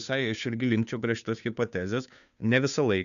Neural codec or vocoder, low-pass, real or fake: codec, 16 kHz, 2 kbps, FunCodec, trained on Chinese and English, 25 frames a second; 7.2 kHz; fake